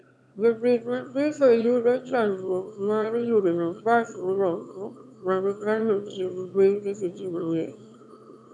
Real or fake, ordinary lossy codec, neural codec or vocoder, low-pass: fake; none; autoencoder, 22.05 kHz, a latent of 192 numbers a frame, VITS, trained on one speaker; none